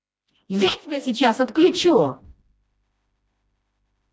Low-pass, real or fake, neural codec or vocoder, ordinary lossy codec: none; fake; codec, 16 kHz, 1 kbps, FreqCodec, smaller model; none